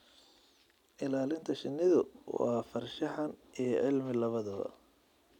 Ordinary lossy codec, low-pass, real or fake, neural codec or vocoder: none; 19.8 kHz; real; none